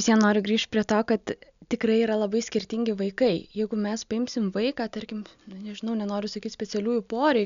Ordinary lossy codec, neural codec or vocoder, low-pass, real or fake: MP3, 96 kbps; none; 7.2 kHz; real